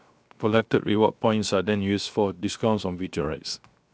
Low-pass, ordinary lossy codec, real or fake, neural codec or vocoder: none; none; fake; codec, 16 kHz, 0.7 kbps, FocalCodec